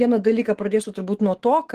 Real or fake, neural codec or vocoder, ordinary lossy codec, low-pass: fake; autoencoder, 48 kHz, 128 numbers a frame, DAC-VAE, trained on Japanese speech; Opus, 16 kbps; 14.4 kHz